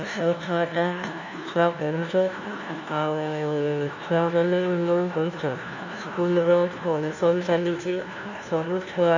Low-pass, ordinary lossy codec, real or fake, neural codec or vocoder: 7.2 kHz; none; fake; codec, 16 kHz, 1 kbps, FunCodec, trained on LibriTTS, 50 frames a second